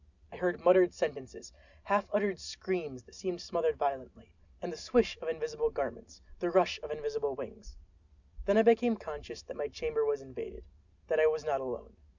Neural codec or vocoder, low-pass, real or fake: none; 7.2 kHz; real